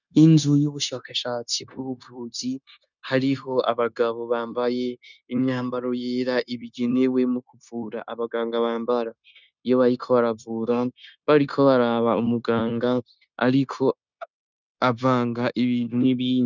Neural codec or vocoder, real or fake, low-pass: codec, 16 kHz, 0.9 kbps, LongCat-Audio-Codec; fake; 7.2 kHz